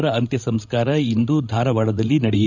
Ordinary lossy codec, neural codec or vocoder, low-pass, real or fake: none; codec, 16 kHz, 16 kbps, FreqCodec, larger model; 7.2 kHz; fake